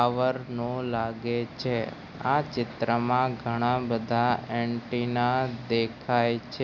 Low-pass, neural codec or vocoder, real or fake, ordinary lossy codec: 7.2 kHz; none; real; none